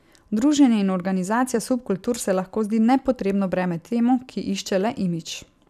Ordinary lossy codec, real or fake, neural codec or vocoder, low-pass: none; fake; vocoder, 44.1 kHz, 128 mel bands every 256 samples, BigVGAN v2; 14.4 kHz